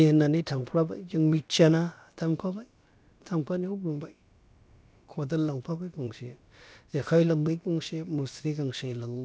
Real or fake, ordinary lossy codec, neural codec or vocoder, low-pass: fake; none; codec, 16 kHz, about 1 kbps, DyCAST, with the encoder's durations; none